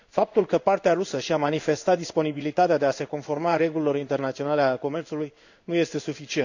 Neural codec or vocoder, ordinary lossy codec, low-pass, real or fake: codec, 16 kHz in and 24 kHz out, 1 kbps, XY-Tokenizer; none; 7.2 kHz; fake